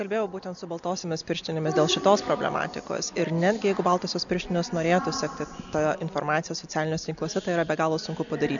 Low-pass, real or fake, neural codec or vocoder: 7.2 kHz; real; none